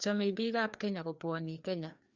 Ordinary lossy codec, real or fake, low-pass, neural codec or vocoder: Opus, 64 kbps; fake; 7.2 kHz; codec, 16 kHz, 2 kbps, FreqCodec, larger model